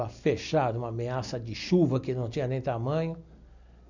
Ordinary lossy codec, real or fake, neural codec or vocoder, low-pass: none; real; none; 7.2 kHz